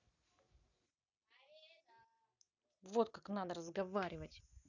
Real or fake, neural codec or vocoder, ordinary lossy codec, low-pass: real; none; none; 7.2 kHz